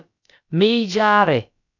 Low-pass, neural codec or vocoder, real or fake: 7.2 kHz; codec, 16 kHz, about 1 kbps, DyCAST, with the encoder's durations; fake